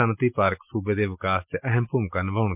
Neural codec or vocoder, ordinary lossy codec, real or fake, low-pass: none; MP3, 32 kbps; real; 3.6 kHz